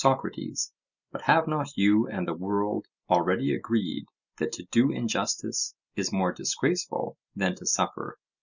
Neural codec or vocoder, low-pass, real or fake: none; 7.2 kHz; real